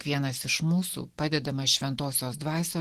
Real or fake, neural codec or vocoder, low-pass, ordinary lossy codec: real; none; 14.4 kHz; Opus, 16 kbps